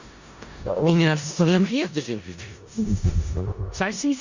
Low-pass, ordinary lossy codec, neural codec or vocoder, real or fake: 7.2 kHz; Opus, 64 kbps; codec, 16 kHz in and 24 kHz out, 0.4 kbps, LongCat-Audio-Codec, four codebook decoder; fake